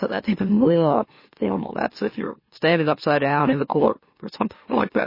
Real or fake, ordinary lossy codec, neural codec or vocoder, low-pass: fake; MP3, 24 kbps; autoencoder, 44.1 kHz, a latent of 192 numbers a frame, MeloTTS; 5.4 kHz